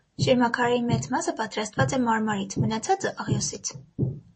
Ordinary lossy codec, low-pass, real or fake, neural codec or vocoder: MP3, 32 kbps; 10.8 kHz; real; none